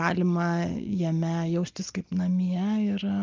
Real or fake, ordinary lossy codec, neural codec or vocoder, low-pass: real; Opus, 24 kbps; none; 7.2 kHz